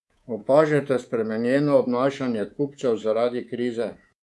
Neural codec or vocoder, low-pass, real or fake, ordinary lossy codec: codec, 24 kHz, 3.1 kbps, DualCodec; none; fake; none